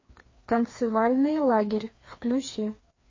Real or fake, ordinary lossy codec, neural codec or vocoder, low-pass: fake; MP3, 32 kbps; codec, 16 kHz, 2 kbps, FreqCodec, larger model; 7.2 kHz